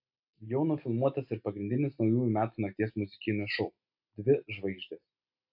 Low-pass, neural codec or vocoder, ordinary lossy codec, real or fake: 5.4 kHz; none; AAC, 48 kbps; real